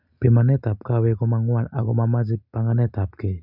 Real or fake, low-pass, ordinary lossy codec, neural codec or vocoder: real; 5.4 kHz; none; none